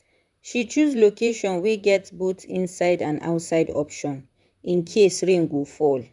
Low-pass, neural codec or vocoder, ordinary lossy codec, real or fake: 10.8 kHz; vocoder, 44.1 kHz, 128 mel bands, Pupu-Vocoder; none; fake